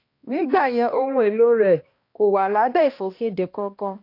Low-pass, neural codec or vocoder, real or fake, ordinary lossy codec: 5.4 kHz; codec, 16 kHz, 1 kbps, X-Codec, HuBERT features, trained on balanced general audio; fake; AAC, 32 kbps